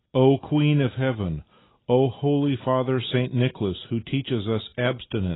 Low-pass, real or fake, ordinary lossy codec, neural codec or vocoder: 7.2 kHz; real; AAC, 16 kbps; none